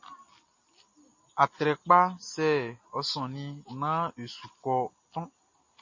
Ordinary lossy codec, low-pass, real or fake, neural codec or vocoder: MP3, 32 kbps; 7.2 kHz; real; none